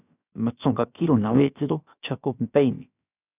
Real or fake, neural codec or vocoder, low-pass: fake; codec, 16 kHz, about 1 kbps, DyCAST, with the encoder's durations; 3.6 kHz